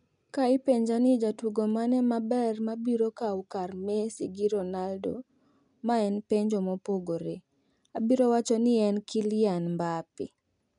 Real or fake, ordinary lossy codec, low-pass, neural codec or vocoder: real; none; 10.8 kHz; none